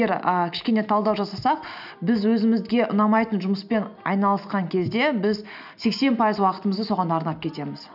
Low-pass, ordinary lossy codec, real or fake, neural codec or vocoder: 5.4 kHz; none; real; none